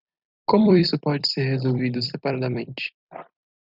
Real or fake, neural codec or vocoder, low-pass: fake; vocoder, 44.1 kHz, 128 mel bands every 256 samples, BigVGAN v2; 5.4 kHz